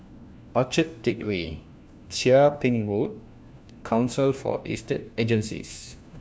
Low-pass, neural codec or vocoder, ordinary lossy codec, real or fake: none; codec, 16 kHz, 1 kbps, FunCodec, trained on LibriTTS, 50 frames a second; none; fake